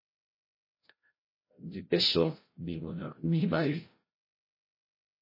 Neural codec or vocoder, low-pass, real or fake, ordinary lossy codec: codec, 16 kHz, 0.5 kbps, FreqCodec, larger model; 5.4 kHz; fake; MP3, 24 kbps